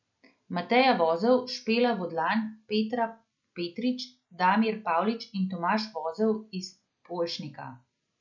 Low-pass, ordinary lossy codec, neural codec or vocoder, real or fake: 7.2 kHz; none; none; real